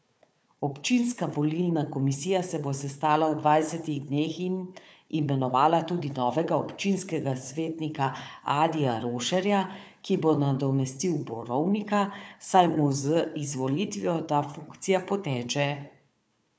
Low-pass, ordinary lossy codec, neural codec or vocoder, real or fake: none; none; codec, 16 kHz, 4 kbps, FunCodec, trained on Chinese and English, 50 frames a second; fake